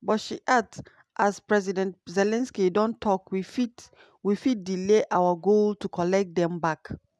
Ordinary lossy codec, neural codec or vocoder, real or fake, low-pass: none; none; real; none